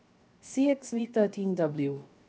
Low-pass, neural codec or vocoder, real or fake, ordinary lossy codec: none; codec, 16 kHz, 0.7 kbps, FocalCodec; fake; none